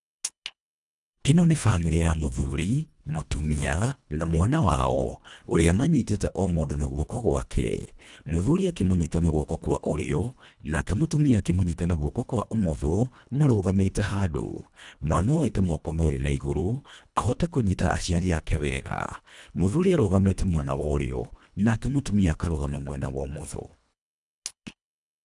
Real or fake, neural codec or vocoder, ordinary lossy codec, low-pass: fake; codec, 24 kHz, 1.5 kbps, HILCodec; none; 10.8 kHz